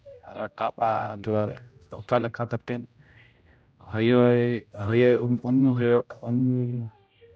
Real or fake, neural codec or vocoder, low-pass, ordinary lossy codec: fake; codec, 16 kHz, 0.5 kbps, X-Codec, HuBERT features, trained on general audio; none; none